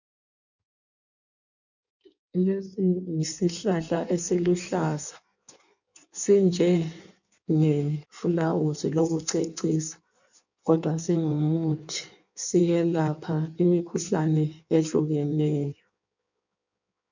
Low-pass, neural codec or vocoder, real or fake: 7.2 kHz; codec, 16 kHz in and 24 kHz out, 1.1 kbps, FireRedTTS-2 codec; fake